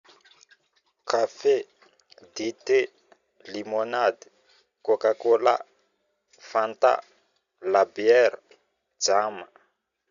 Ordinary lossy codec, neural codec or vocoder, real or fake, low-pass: none; none; real; 7.2 kHz